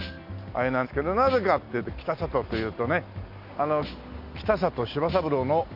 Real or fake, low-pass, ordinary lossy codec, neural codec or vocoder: real; 5.4 kHz; none; none